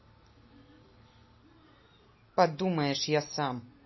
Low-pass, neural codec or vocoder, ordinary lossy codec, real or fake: 7.2 kHz; none; MP3, 24 kbps; real